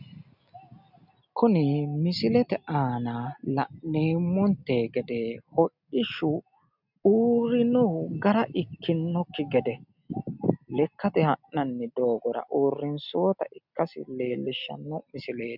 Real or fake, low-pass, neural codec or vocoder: real; 5.4 kHz; none